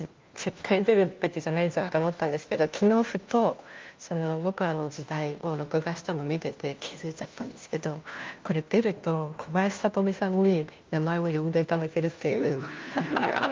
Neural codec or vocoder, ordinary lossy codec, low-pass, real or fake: codec, 16 kHz, 1 kbps, FunCodec, trained on LibriTTS, 50 frames a second; Opus, 32 kbps; 7.2 kHz; fake